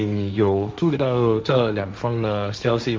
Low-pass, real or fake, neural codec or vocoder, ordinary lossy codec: none; fake; codec, 16 kHz, 1.1 kbps, Voila-Tokenizer; none